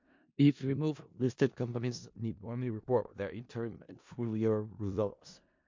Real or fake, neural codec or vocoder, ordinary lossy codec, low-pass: fake; codec, 16 kHz in and 24 kHz out, 0.4 kbps, LongCat-Audio-Codec, four codebook decoder; MP3, 48 kbps; 7.2 kHz